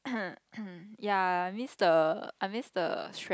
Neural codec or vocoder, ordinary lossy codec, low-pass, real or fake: none; none; none; real